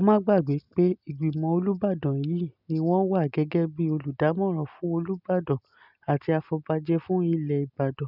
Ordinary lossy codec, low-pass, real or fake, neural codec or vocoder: none; 5.4 kHz; real; none